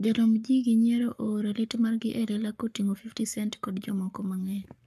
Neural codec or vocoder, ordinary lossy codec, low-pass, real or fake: codec, 44.1 kHz, 7.8 kbps, Pupu-Codec; none; 14.4 kHz; fake